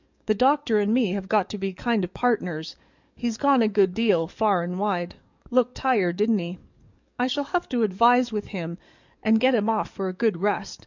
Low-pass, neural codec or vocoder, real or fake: 7.2 kHz; codec, 44.1 kHz, 7.8 kbps, DAC; fake